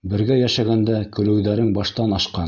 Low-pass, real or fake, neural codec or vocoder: 7.2 kHz; real; none